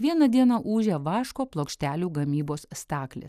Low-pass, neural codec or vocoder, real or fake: 14.4 kHz; none; real